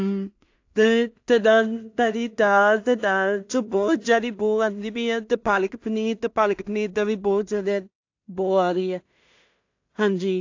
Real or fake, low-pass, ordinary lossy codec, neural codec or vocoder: fake; 7.2 kHz; AAC, 48 kbps; codec, 16 kHz in and 24 kHz out, 0.4 kbps, LongCat-Audio-Codec, two codebook decoder